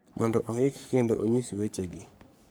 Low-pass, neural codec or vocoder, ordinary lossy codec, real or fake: none; codec, 44.1 kHz, 3.4 kbps, Pupu-Codec; none; fake